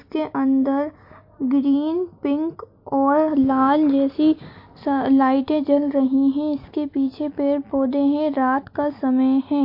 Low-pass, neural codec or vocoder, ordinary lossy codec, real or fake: 5.4 kHz; none; MP3, 32 kbps; real